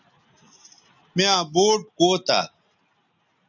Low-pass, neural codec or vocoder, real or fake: 7.2 kHz; none; real